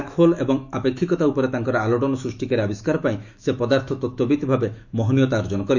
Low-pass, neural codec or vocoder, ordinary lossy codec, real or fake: 7.2 kHz; autoencoder, 48 kHz, 128 numbers a frame, DAC-VAE, trained on Japanese speech; none; fake